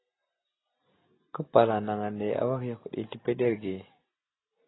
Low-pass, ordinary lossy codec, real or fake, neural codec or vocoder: 7.2 kHz; AAC, 16 kbps; real; none